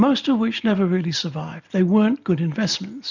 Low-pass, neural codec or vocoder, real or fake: 7.2 kHz; none; real